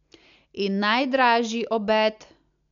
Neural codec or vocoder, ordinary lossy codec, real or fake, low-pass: none; none; real; 7.2 kHz